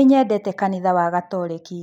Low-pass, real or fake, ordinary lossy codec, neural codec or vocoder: 19.8 kHz; real; none; none